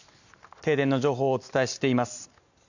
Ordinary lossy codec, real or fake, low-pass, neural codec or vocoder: none; real; 7.2 kHz; none